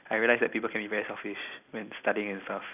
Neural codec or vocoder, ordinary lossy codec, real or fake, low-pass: none; none; real; 3.6 kHz